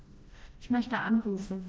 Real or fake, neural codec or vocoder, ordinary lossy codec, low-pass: fake; codec, 16 kHz, 1 kbps, FreqCodec, smaller model; none; none